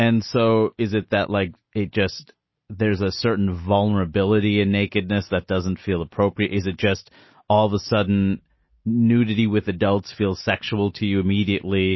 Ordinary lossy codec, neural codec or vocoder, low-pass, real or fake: MP3, 24 kbps; none; 7.2 kHz; real